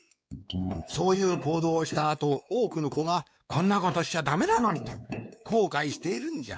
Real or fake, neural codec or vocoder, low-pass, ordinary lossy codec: fake; codec, 16 kHz, 2 kbps, X-Codec, WavLM features, trained on Multilingual LibriSpeech; none; none